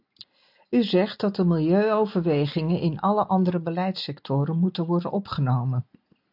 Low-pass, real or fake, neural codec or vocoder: 5.4 kHz; real; none